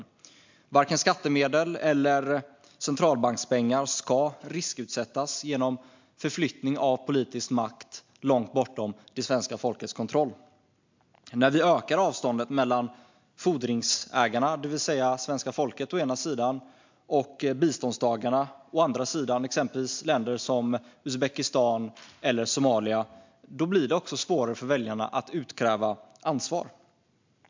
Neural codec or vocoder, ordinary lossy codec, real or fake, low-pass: none; MP3, 64 kbps; real; 7.2 kHz